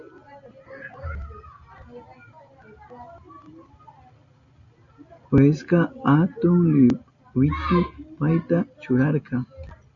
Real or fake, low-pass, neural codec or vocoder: real; 7.2 kHz; none